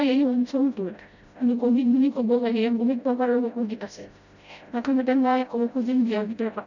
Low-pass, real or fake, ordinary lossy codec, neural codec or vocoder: 7.2 kHz; fake; none; codec, 16 kHz, 0.5 kbps, FreqCodec, smaller model